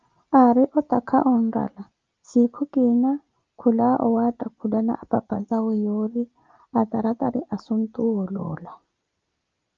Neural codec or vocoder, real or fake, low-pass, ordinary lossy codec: none; real; 7.2 kHz; Opus, 24 kbps